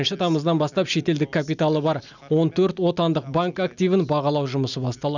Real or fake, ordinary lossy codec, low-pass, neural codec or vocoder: real; none; 7.2 kHz; none